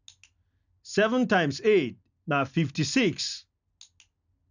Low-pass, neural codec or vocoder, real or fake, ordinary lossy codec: 7.2 kHz; none; real; none